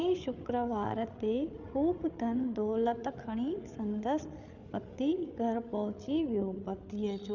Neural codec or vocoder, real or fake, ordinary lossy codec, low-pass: codec, 16 kHz, 8 kbps, FreqCodec, larger model; fake; none; 7.2 kHz